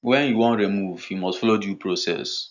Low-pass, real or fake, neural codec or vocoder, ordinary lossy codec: 7.2 kHz; real; none; none